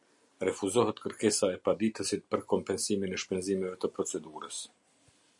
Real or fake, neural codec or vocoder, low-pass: real; none; 10.8 kHz